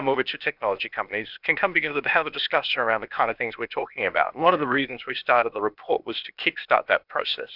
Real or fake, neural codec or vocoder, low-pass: fake; codec, 16 kHz, 0.8 kbps, ZipCodec; 5.4 kHz